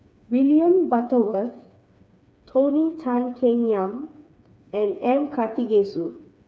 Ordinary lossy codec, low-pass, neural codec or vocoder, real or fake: none; none; codec, 16 kHz, 4 kbps, FreqCodec, smaller model; fake